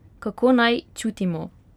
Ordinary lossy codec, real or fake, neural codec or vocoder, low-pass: none; real; none; 19.8 kHz